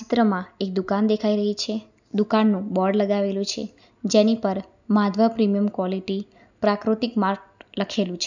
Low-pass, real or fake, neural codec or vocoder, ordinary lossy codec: 7.2 kHz; real; none; none